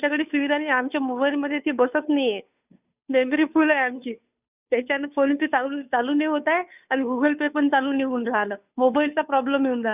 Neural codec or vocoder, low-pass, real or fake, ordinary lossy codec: codec, 16 kHz, 2 kbps, FunCodec, trained on Chinese and English, 25 frames a second; 3.6 kHz; fake; none